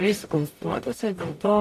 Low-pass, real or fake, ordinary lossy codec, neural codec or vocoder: 14.4 kHz; fake; AAC, 64 kbps; codec, 44.1 kHz, 0.9 kbps, DAC